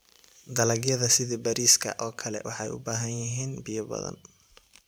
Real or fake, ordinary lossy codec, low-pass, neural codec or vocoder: fake; none; none; vocoder, 44.1 kHz, 128 mel bands every 512 samples, BigVGAN v2